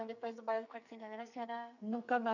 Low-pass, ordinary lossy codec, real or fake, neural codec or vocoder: 7.2 kHz; none; fake; codec, 44.1 kHz, 2.6 kbps, SNAC